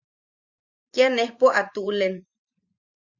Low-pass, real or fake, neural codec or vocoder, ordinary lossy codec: 7.2 kHz; fake; vocoder, 44.1 kHz, 128 mel bands every 512 samples, BigVGAN v2; Opus, 64 kbps